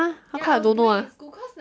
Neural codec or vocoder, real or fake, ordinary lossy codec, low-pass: none; real; none; none